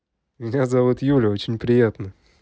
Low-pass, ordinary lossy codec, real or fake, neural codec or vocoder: none; none; real; none